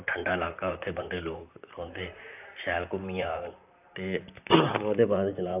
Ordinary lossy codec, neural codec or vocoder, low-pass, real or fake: AAC, 32 kbps; vocoder, 44.1 kHz, 128 mel bands, Pupu-Vocoder; 3.6 kHz; fake